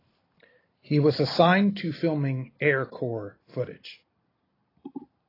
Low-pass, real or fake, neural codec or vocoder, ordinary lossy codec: 5.4 kHz; real; none; AAC, 24 kbps